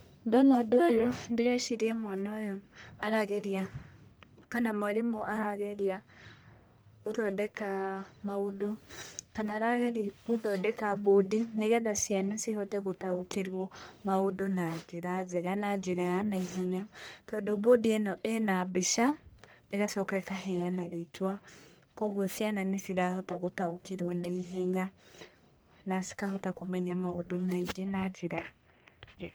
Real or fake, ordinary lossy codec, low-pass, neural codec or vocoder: fake; none; none; codec, 44.1 kHz, 1.7 kbps, Pupu-Codec